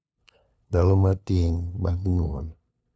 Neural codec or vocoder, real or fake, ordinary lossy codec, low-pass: codec, 16 kHz, 2 kbps, FunCodec, trained on LibriTTS, 25 frames a second; fake; none; none